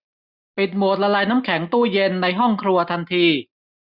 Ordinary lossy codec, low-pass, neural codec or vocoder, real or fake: none; 5.4 kHz; none; real